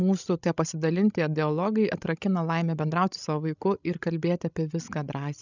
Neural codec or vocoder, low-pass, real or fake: codec, 16 kHz, 16 kbps, FreqCodec, larger model; 7.2 kHz; fake